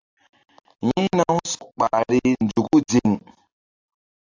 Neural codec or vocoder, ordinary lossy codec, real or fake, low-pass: none; AAC, 48 kbps; real; 7.2 kHz